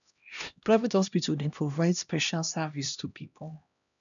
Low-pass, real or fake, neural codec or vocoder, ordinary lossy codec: 7.2 kHz; fake; codec, 16 kHz, 1 kbps, X-Codec, HuBERT features, trained on LibriSpeech; none